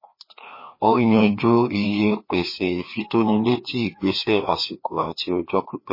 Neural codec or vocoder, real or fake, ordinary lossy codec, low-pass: codec, 16 kHz, 2 kbps, FreqCodec, larger model; fake; MP3, 24 kbps; 5.4 kHz